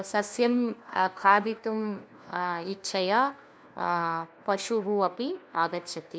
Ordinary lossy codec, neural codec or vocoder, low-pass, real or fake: none; codec, 16 kHz, 1 kbps, FunCodec, trained on LibriTTS, 50 frames a second; none; fake